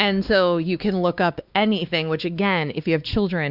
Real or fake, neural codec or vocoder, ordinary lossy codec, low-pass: fake; codec, 16 kHz, 2 kbps, X-Codec, WavLM features, trained on Multilingual LibriSpeech; Opus, 64 kbps; 5.4 kHz